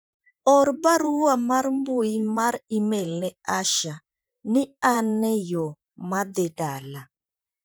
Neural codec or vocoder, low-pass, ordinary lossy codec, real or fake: vocoder, 44.1 kHz, 128 mel bands, Pupu-Vocoder; none; none; fake